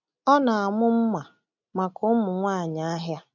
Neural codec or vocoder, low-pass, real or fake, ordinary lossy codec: none; 7.2 kHz; real; none